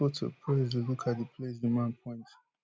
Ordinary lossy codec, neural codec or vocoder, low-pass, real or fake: none; none; none; real